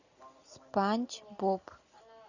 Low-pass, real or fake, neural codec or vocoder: 7.2 kHz; real; none